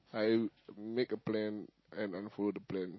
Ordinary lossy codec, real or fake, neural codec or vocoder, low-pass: MP3, 24 kbps; real; none; 7.2 kHz